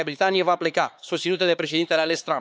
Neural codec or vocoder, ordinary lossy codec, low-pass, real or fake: codec, 16 kHz, 4 kbps, X-Codec, HuBERT features, trained on LibriSpeech; none; none; fake